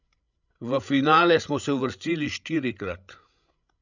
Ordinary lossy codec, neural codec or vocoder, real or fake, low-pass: none; codec, 16 kHz, 16 kbps, FreqCodec, larger model; fake; 7.2 kHz